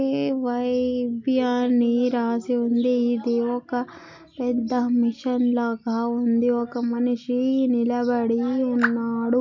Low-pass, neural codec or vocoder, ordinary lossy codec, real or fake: 7.2 kHz; none; MP3, 48 kbps; real